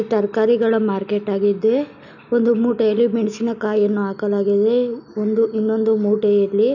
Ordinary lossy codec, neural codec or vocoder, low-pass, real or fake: none; none; 7.2 kHz; real